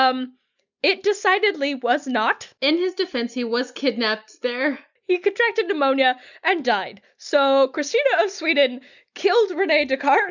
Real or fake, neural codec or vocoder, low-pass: real; none; 7.2 kHz